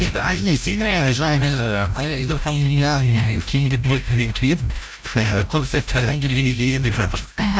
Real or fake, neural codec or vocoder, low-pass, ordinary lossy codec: fake; codec, 16 kHz, 0.5 kbps, FreqCodec, larger model; none; none